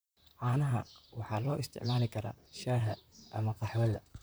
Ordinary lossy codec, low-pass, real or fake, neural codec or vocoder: none; none; fake; vocoder, 44.1 kHz, 128 mel bands, Pupu-Vocoder